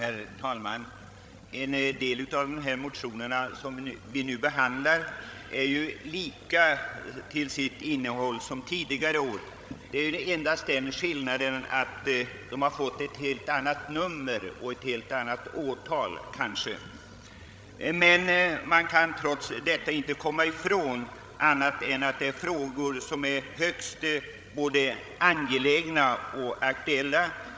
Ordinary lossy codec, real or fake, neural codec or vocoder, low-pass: none; fake; codec, 16 kHz, 16 kbps, FreqCodec, larger model; none